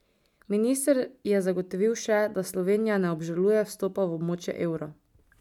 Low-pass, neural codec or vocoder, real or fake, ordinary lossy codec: 19.8 kHz; none; real; none